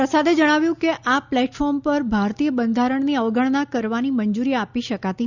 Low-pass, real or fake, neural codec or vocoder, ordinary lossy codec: 7.2 kHz; real; none; Opus, 64 kbps